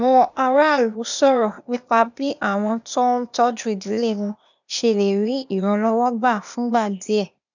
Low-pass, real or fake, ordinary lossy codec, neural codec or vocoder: 7.2 kHz; fake; none; codec, 16 kHz, 0.8 kbps, ZipCodec